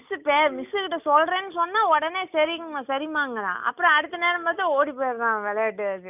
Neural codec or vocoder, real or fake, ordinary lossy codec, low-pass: none; real; none; 3.6 kHz